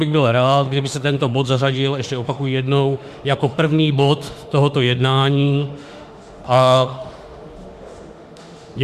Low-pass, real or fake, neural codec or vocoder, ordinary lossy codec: 14.4 kHz; fake; autoencoder, 48 kHz, 32 numbers a frame, DAC-VAE, trained on Japanese speech; Opus, 64 kbps